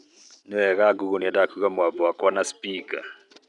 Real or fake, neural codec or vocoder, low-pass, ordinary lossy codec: real; none; 10.8 kHz; none